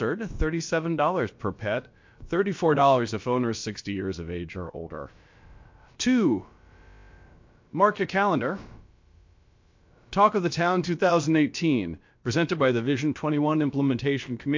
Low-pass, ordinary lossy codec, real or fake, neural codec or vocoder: 7.2 kHz; MP3, 48 kbps; fake; codec, 16 kHz, about 1 kbps, DyCAST, with the encoder's durations